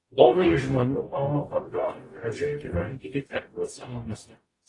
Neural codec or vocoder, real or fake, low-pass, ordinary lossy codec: codec, 44.1 kHz, 0.9 kbps, DAC; fake; 10.8 kHz; AAC, 32 kbps